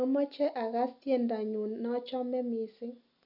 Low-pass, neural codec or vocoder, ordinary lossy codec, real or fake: 5.4 kHz; none; none; real